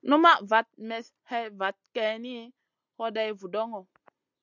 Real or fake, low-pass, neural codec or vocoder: real; 7.2 kHz; none